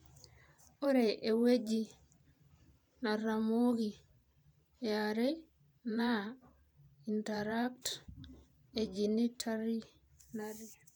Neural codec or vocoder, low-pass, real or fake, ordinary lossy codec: vocoder, 44.1 kHz, 128 mel bands every 512 samples, BigVGAN v2; none; fake; none